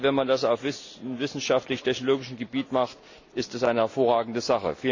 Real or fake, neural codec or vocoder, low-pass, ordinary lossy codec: real; none; 7.2 kHz; AAC, 48 kbps